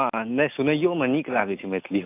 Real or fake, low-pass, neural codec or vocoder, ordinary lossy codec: real; 3.6 kHz; none; AAC, 24 kbps